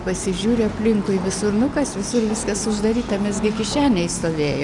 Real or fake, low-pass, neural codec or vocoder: real; 10.8 kHz; none